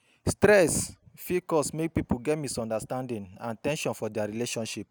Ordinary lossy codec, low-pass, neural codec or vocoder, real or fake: none; none; none; real